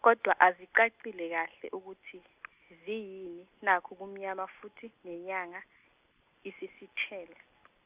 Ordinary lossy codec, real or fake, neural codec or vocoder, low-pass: Opus, 64 kbps; real; none; 3.6 kHz